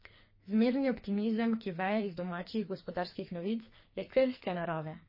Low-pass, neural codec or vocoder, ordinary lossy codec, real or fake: 5.4 kHz; codec, 44.1 kHz, 2.6 kbps, SNAC; MP3, 24 kbps; fake